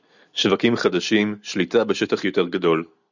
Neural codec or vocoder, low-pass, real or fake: none; 7.2 kHz; real